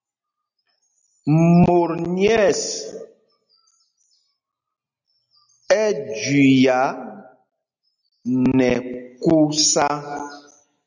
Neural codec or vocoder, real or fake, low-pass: none; real; 7.2 kHz